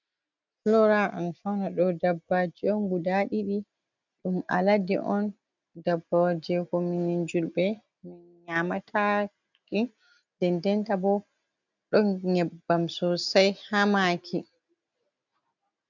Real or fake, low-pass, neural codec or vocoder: real; 7.2 kHz; none